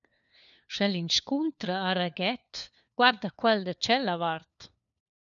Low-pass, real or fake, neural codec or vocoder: 7.2 kHz; fake; codec, 16 kHz, 4 kbps, FunCodec, trained on LibriTTS, 50 frames a second